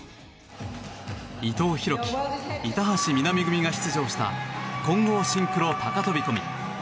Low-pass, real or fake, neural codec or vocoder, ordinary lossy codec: none; real; none; none